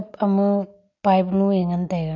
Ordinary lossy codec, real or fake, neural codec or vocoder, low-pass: none; real; none; 7.2 kHz